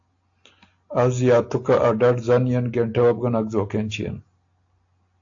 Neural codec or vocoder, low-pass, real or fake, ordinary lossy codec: none; 7.2 kHz; real; AAC, 48 kbps